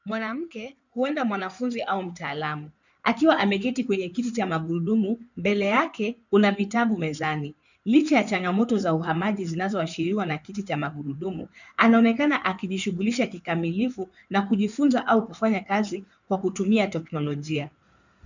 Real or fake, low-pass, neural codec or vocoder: fake; 7.2 kHz; codec, 16 kHz in and 24 kHz out, 2.2 kbps, FireRedTTS-2 codec